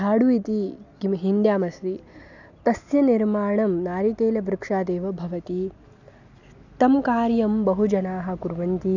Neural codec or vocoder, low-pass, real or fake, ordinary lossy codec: none; 7.2 kHz; real; none